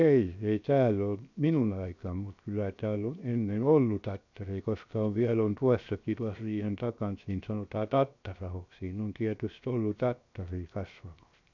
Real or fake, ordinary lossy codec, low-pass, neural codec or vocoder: fake; none; 7.2 kHz; codec, 16 kHz, 0.7 kbps, FocalCodec